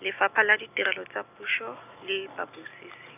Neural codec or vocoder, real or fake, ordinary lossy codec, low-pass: none; real; none; 3.6 kHz